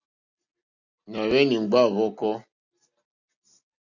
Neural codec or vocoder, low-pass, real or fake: none; 7.2 kHz; real